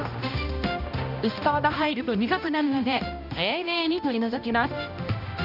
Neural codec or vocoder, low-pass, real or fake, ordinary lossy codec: codec, 16 kHz, 1 kbps, X-Codec, HuBERT features, trained on balanced general audio; 5.4 kHz; fake; none